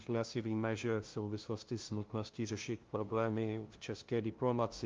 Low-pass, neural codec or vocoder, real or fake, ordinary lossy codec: 7.2 kHz; codec, 16 kHz, 0.5 kbps, FunCodec, trained on LibriTTS, 25 frames a second; fake; Opus, 32 kbps